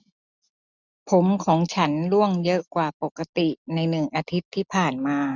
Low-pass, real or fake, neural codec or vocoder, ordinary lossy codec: 7.2 kHz; real; none; none